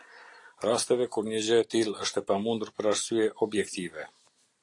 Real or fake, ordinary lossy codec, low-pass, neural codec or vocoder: real; AAC, 48 kbps; 10.8 kHz; none